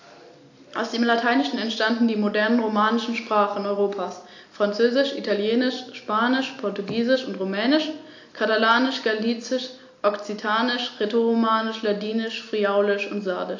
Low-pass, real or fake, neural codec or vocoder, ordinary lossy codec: 7.2 kHz; real; none; AAC, 48 kbps